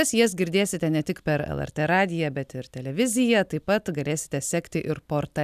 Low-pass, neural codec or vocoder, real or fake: 14.4 kHz; none; real